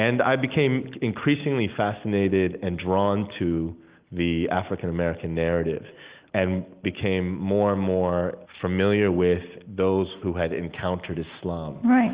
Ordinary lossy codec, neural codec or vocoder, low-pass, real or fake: Opus, 32 kbps; none; 3.6 kHz; real